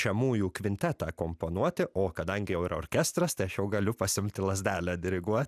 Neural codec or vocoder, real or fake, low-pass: none; real; 14.4 kHz